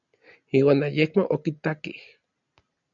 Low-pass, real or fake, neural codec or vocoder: 7.2 kHz; real; none